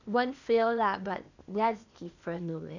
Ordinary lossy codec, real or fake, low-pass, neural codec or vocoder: none; fake; 7.2 kHz; codec, 16 kHz, 0.8 kbps, ZipCodec